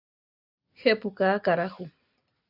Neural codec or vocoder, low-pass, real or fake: none; 5.4 kHz; real